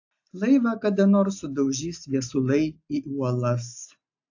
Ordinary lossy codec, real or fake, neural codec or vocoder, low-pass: AAC, 48 kbps; real; none; 7.2 kHz